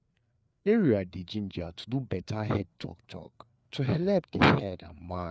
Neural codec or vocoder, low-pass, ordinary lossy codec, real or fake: codec, 16 kHz, 4 kbps, FreqCodec, larger model; none; none; fake